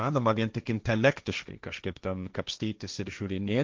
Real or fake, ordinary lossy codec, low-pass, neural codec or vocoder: fake; Opus, 24 kbps; 7.2 kHz; codec, 16 kHz, 1.1 kbps, Voila-Tokenizer